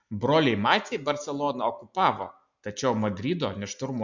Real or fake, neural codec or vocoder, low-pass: real; none; 7.2 kHz